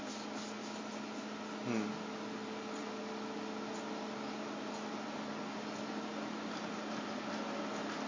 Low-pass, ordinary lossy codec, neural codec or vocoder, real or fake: 7.2 kHz; MP3, 32 kbps; none; real